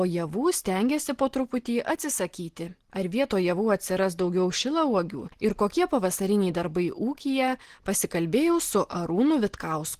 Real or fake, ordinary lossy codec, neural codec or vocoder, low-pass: real; Opus, 16 kbps; none; 14.4 kHz